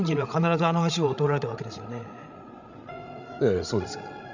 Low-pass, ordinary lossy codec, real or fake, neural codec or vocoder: 7.2 kHz; none; fake; codec, 16 kHz, 16 kbps, FreqCodec, larger model